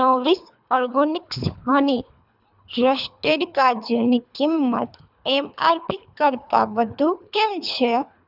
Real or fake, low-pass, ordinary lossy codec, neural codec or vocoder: fake; 5.4 kHz; none; codec, 24 kHz, 3 kbps, HILCodec